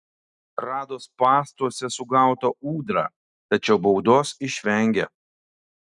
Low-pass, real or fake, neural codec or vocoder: 10.8 kHz; real; none